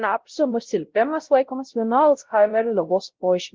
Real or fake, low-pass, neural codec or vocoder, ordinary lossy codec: fake; 7.2 kHz; codec, 16 kHz, 0.5 kbps, X-Codec, WavLM features, trained on Multilingual LibriSpeech; Opus, 16 kbps